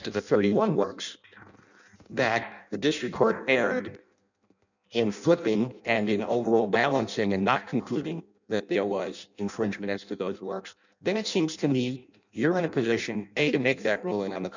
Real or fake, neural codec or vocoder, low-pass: fake; codec, 16 kHz in and 24 kHz out, 0.6 kbps, FireRedTTS-2 codec; 7.2 kHz